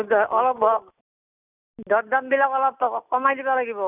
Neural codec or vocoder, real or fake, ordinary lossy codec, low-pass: none; real; none; 3.6 kHz